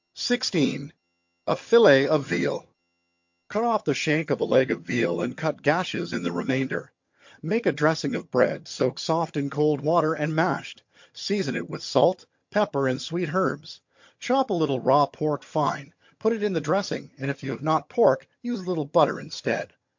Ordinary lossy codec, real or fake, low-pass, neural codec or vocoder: MP3, 48 kbps; fake; 7.2 kHz; vocoder, 22.05 kHz, 80 mel bands, HiFi-GAN